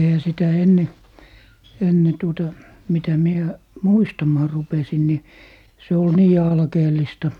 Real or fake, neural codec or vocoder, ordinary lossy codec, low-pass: real; none; none; 19.8 kHz